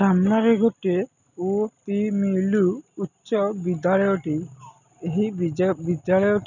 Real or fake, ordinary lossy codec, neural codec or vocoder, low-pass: real; none; none; 7.2 kHz